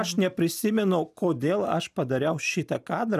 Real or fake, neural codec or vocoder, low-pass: real; none; 14.4 kHz